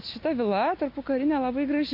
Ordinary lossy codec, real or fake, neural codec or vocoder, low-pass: MP3, 32 kbps; real; none; 5.4 kHz